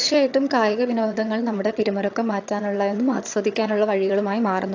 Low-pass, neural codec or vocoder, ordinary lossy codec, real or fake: 7.2 kHz; vocoder, 22.05 kHz, 80 mel bands, HiFi-GAN; AAC, 48 kbps; fake